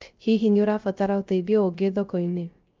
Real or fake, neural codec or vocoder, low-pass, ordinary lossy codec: fake; codec, 16 kHz, 0.3 kbps, FocalCodec; 7.2 kHz; Opus, 24 kbps